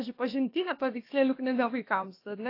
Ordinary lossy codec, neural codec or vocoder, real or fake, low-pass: AAC, 32 kbps; codec, 16 kHz, about 1 kbps, DyCAST, with the encoder's durations; fake; 5.4 kHz